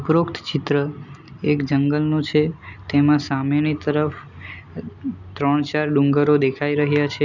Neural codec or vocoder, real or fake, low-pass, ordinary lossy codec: none; real; 7.2 kHz; none